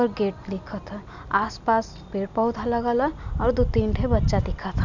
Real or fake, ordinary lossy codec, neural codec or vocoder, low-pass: real; none; none; 7.2 kHz